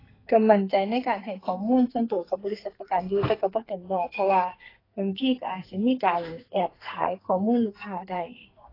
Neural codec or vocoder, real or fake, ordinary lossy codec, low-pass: codec, 44.1 kHz, 2.6 kbps, SNAC; fake; AAC, 24 kbps; 5.4 kHz